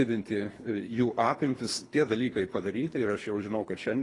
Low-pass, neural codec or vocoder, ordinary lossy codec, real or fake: 10.8 kHz; codec, 24 kHz, 3 kbps, HILCodec; AAC, 32 kbps; fake